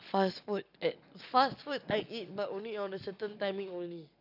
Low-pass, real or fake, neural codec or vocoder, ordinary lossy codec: 5.4 kHz; real; none; none